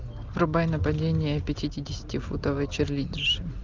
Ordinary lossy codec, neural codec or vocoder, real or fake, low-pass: Opus, 24 kbps; none; real; 7.2 kHz